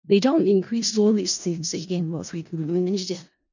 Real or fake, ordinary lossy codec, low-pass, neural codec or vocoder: fake; none; 7.2 kHz; codec, 16 kHz in and 24 kHz out, 0.4 kbps, LongCat-Audio-Codec, four codebook decoder